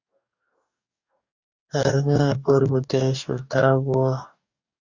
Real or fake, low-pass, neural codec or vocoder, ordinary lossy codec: fake; 7.2 kHz; codec, 44.1 kHz, 2.6 kbps, DAC; Opus, 64 kbps